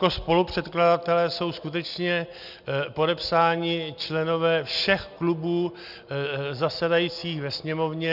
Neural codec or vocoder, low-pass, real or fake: none; 5.4 kHz; real